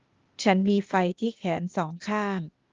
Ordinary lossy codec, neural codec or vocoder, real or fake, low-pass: Opus, 32 kbps; codec, 16 kHz, 0.8 kbps, ZipCodec; fake; 7.2 kHz